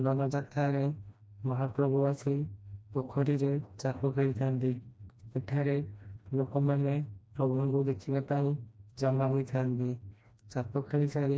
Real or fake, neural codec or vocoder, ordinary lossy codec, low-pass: fake; codec, 16 kHz, 1 kbps, FreqCodec, smaller model; none; none